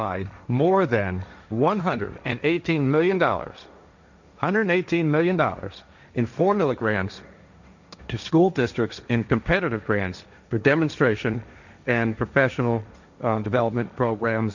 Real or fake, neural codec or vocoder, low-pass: fake; codec, 16 kHz, 1.1 kbps, Voila-Tokenizer; 7.2 kHz